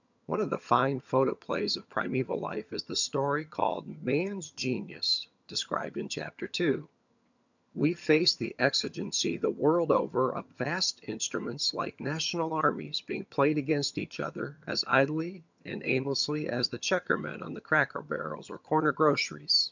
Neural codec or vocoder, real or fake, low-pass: vocoder, 22.05 kHz, 80 mel bands, HiFi-GAN; fake; 7.2 kHz